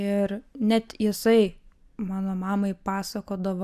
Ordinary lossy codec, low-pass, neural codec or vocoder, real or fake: AAC, 96 kbps; 14.4 kHz; none; real